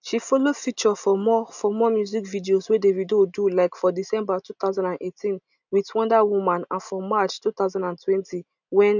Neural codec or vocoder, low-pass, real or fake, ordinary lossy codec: none; 7.2 kHz; real; none